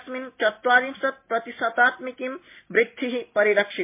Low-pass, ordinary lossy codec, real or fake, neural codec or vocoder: 3.6 kHz; MP3, 24 kbps; real; none